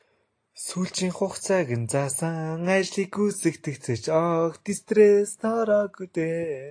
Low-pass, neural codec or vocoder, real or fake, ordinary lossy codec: 9.9 kHz; none; real; AAC, 48 kbps